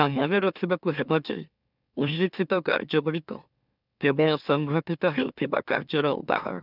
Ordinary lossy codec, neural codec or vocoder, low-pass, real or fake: none; autoencoder, 44.1 kHz, a latent of 192 numbers a frame, MeloTTS; 5.4 kHz; fake